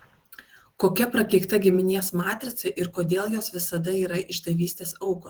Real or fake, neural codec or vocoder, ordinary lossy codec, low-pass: fake; vocoder, 48 kHz, 128 mel bands, Vocos; Opus, 16 kbps; 19.8 kHz